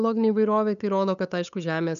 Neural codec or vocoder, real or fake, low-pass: codec, 16 kHz, 8 kbps, FunCodec, trained on LibriTTS, 25 frames a second; fake; 7.2 kHz